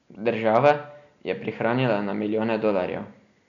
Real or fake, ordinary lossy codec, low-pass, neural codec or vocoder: real; none; 7.2 kHz; none